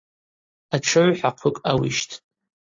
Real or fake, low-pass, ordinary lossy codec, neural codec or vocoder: real; 7.2 kHz; AAC, 48 kbps; none